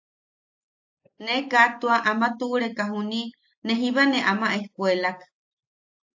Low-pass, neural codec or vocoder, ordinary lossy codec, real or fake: 7.2 kHz; none; AAC, 48 kbps; real